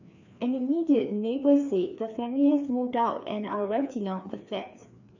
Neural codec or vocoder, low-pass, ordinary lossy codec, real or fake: codec, 16 kHz, 2 kbps, FreqCodec, larger model; 7.2 kHz; none; fake